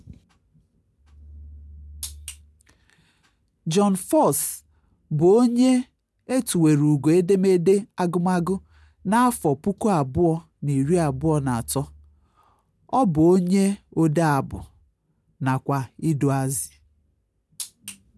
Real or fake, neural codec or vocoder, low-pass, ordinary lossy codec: real; none; none; none